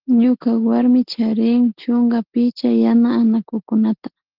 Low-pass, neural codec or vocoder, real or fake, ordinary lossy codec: 5.4 kHz; none; real; Opus, 16 kbps